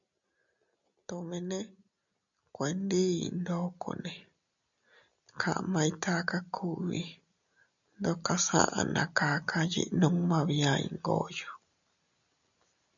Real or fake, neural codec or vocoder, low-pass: real; none; 7.2 kHz